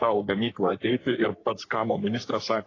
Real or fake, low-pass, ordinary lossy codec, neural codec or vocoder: fake; 7.2 kHz; AAC, 32 kbps; codec, 44.1 kHz, 3.4 kbps, Pupu-Codec